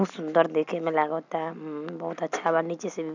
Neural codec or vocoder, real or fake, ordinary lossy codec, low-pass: none; real; none; 7.2 kHz